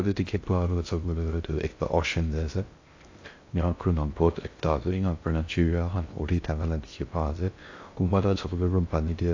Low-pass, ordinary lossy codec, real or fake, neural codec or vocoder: 7.2 kHz; AAC, 48 kbps; fake; codec, 16 kHz in and 24 kHz out, 0.6 kbps, FocalCodec, streaming, 2048 codes